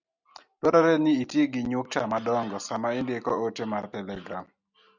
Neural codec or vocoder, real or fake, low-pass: none; real; 7.2 kHz